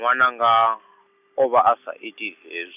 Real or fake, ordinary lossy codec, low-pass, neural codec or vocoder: real; none; 3.6 kHz; none